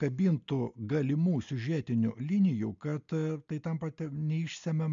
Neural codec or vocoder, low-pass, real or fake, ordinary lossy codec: none; 7.2 kHz; real; MP3, 64 kbps